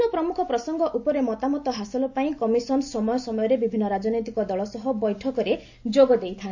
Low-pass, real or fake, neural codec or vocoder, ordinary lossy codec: 7.2 kHz; real; none; AAC, 48 kbps